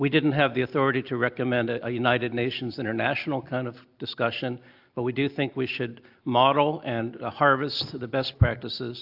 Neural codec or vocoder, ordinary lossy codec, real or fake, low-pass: none; AAC, 48 kbps; real; 5.4 kHz